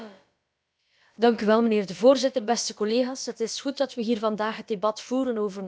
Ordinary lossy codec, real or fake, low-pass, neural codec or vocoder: none; fake; none; codec, 16 kHz, about 1 kbps, DyCAST, with the encoder's durations